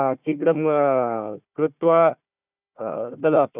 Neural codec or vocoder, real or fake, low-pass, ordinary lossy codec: codec, 16 kHz, 1 kbps, FunCodec, trained on Chinese and English, 50 frames a second; fake; 3.6 kHz; none